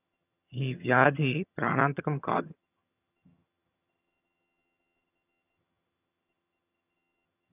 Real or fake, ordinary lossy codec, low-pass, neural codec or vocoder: fake; none; 3.6 kHz; vocoder, 22.05 kHz, 80 mel bands, HiFi-GAN